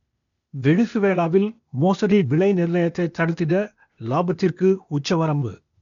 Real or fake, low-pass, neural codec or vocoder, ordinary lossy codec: fake; 7.2 kHz; codec, 16 kHz, 0.8 kbps, ZipCodec; none